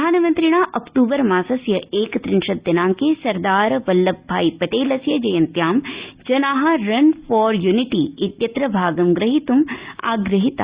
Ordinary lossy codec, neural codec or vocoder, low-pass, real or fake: Opus, 64 kbps; none; 3.6 kHz; real